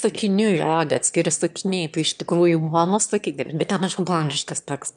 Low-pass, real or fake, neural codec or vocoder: 9.9 kHz; fake; autoencoder, 22.05 kHz, a latent of 192 numbers a frame, VITS, trained on one speaker